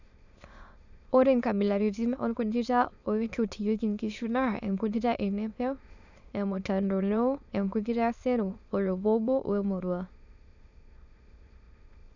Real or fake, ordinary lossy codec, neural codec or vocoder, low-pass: fake; none; autoencoder, 22.05 kHz, a latent of 192 numbers a frame, VITS, trained on many speakers; 7.2 kHz